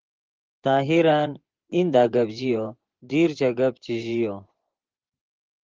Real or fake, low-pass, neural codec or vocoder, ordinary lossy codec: fake; 7.2 kHz; vocoder, 24 kHz, 100 mel bands, Vocos; Opus, 16 kbps